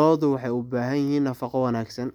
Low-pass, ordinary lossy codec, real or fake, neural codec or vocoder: 19.8 kHz; none; real; none